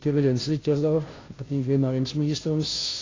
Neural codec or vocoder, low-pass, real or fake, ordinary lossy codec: codec, 16 kHz, 0.5 kbps, FunCodec, trained on Chinese and English, 25 frames a second; 7.2 kHz; fake; AAC, 32 kbps